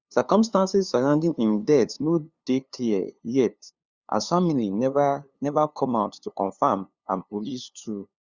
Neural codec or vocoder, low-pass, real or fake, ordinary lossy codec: codec, 16 kHz, 2 kbps, FunCodec, trained on LibriTTS, 25 frames a second; 7.2 kHz; fake; Opus, 64 kbps